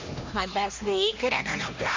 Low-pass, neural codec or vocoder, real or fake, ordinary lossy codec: 7.2 kHz; codec, 16 kHz, 1 kbps, X-Codec, HuBERT features, trained on LibriSpeech; fake; MP3, 64 kbps